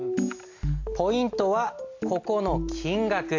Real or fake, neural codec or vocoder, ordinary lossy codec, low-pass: real; none; AAC, 48 kbps; 7.2 kHz